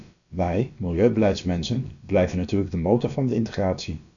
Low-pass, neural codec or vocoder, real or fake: 7.2 kHz; codec, 16 kHz, about 1 kbps, DyCAST, with the encoder's durations; fake